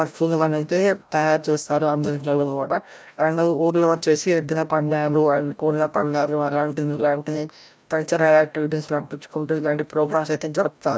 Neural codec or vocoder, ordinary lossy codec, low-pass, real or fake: codec, 16 kHz, 0.5 kbps, FreqCodec, larger model; none; none; fake